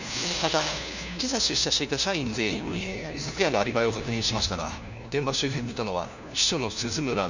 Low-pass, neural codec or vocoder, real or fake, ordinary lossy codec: 7.2 kHz; codec, 16 kHz, 1 kbps, FunCodec, trained on LibriTTS, 50 frames a second; fake; none